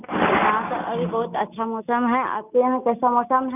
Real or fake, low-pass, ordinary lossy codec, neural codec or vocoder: real; 3.6 kHz; none; none